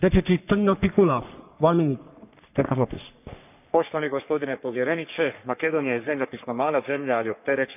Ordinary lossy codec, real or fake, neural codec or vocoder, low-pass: none; fake; codec, 44.1 kHz, 3.4 kbps, Pupu-Codec; 3.6 kHz